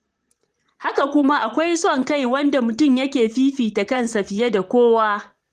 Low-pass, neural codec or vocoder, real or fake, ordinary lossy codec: 14.4 kHz; vocoder, 44.1 kHz, 128 mel bands, Pupu-Vocoder; fake; Opus, 24 kbps